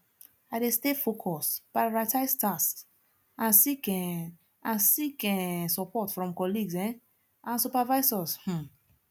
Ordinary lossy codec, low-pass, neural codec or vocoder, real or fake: none; none; none; real